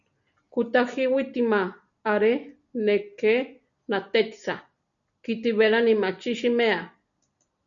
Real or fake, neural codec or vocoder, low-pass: real; none; 7.2 kHz